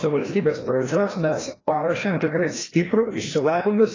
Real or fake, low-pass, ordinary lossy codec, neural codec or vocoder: fake; 7.2 kHz; AAC, 32 kbps; codec, 16 kHz, 1 kbps, FreqCodec, larger model